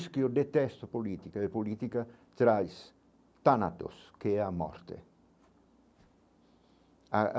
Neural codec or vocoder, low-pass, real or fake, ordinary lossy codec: none; none; real; none